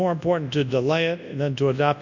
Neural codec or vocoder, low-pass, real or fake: codec, 24 kHz, 0.9 kbps, WavTokenizer, large speech release; 7.2 kHz; fake